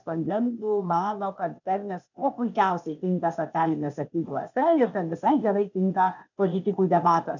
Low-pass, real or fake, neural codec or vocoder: 7.2 kHz; fake; codec, 16 kHz, 0.8 kbps, ZipCodec